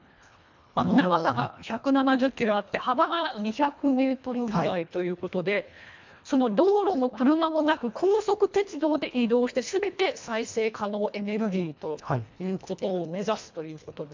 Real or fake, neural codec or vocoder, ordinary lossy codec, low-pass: fake; codec, 24 kHz, 1.5 kbps, HILCodec; MP3, 64 kbps; 7.2 kHz